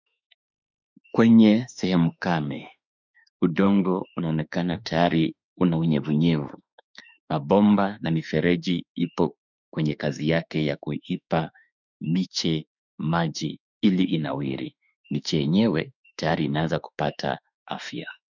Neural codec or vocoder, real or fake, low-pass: autoencoder, 48 kHz, 32 numbers a frame, DAC-VAE, trained on Japanese speech; fake; 7.2 kHz